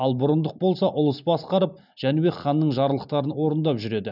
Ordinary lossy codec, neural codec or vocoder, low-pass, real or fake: none; none; 5.4 kHz; real